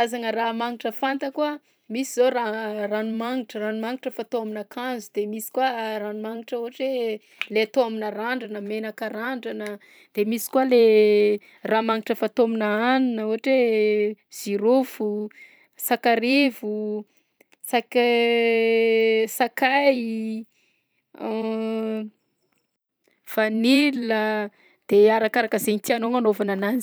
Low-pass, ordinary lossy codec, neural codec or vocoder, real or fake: none; none; vocoder, 44.1 kHz, 128 mel bands every 512 samples, BigVGAN v2; fake